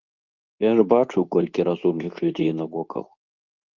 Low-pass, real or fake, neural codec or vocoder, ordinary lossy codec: 7.2 kHz; fake; codec, 24 kHz, 0.9 kbps, WavTokenizer, medium speech release version 2; Opus, 24 kbps